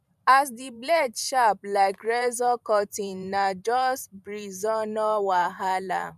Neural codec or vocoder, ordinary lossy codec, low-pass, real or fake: vocoder, 44.1 kHz, 128 mel bands every 512 samples, BigVGAN v2; none; 14.4 kHz; fake